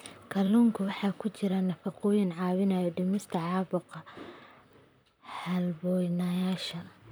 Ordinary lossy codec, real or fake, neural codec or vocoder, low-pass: none; fake; vocoder, 44.1 kHz, 128 mel bands, Pupu-Vocoder; none